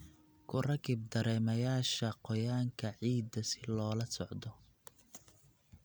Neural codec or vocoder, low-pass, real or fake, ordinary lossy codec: none; none; real; none